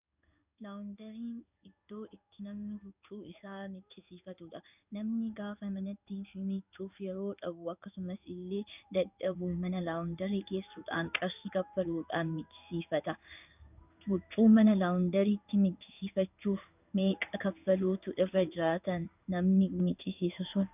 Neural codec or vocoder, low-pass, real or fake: codec, 16 kHz in and 24 kHz out, 1 kbps, XY-Tokenizer; 3.6 kHz; fake